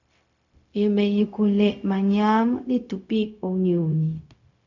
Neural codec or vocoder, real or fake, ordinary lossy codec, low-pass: codec, 16 kHz, 0.4 kbps, LongCat-Audio-Codec; fake; MP3, 64 kbps; 7.2 kHz